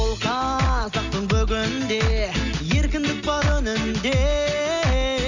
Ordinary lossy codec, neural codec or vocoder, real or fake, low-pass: none; none; real; 7.2 kHz